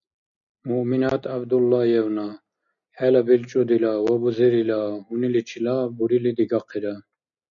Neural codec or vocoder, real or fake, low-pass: none; real; 7.2 kHz